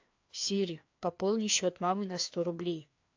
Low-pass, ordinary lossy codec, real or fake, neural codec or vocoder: 7.2 kHz; AAC, 48 kbps; fake; codec, 16 kHz, 2 kbps, FreqCodec, larger model